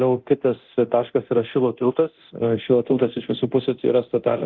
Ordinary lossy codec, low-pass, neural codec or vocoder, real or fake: Opus, 24 kbps; 7.2 kHz; codec, 24 kHz, 0.9 kbps, DualCodec; fake